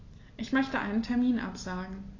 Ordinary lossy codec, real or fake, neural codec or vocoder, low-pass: AAC, 32 kbps; real; none; 7.2 kHz